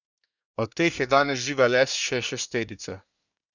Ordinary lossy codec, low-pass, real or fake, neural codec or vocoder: none; 7.2 kHz; fake; codec, 24 kHz, 1 kbps, SNAC